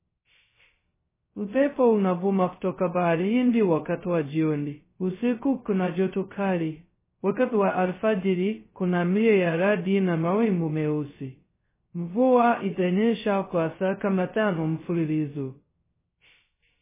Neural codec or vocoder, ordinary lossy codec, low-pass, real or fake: codec, 16 kHz, 0.2 kbps, FocalCodec; MP3, 16 kbps; 3.6 kHz; fake